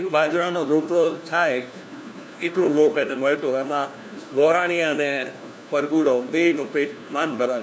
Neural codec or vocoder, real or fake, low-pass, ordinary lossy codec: codec, 16 kHz, 1 kbps, FunCodec, trained on LibriTTS, 50 frames a second; fake; none; none